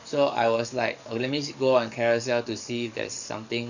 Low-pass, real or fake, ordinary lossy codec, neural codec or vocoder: 7.2 kHz; fake; none; codec, 44.1 kHz, 7.8 kbps, DAC